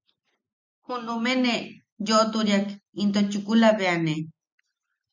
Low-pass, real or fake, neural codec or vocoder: 7.2 kHz; real; none